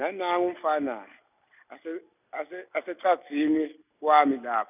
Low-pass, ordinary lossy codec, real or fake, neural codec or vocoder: 3.6 kHz; none; real; none